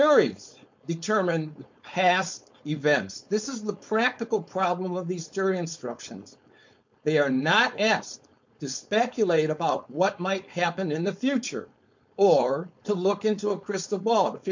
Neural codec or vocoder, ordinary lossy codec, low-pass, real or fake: codec, 16 kHz, 4.8 kbps, FACodec; MP3, 48 kbps; 7.2 kHz; fake